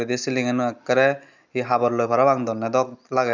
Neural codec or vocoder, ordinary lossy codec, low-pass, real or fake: none; none; 7.2 kHz; real